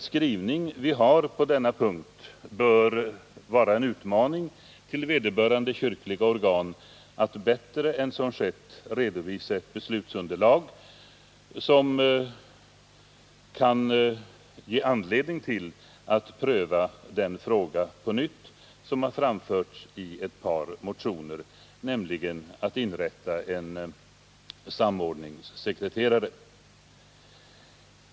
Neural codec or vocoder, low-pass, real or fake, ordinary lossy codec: none; none; real; none